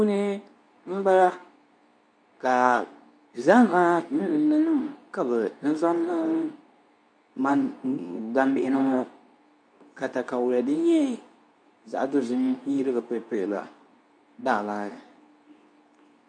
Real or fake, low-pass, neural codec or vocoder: fake; 9.9 kHz; codec, 24 kHz, 0.9 kbps, WavTokenizer, medium speech release version 2